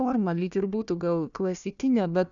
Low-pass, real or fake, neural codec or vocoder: 7.2 kHz; fake; codec, 16 kHz, 1 kbps, FunCodec, trained on Chinese and English, 50 frames a second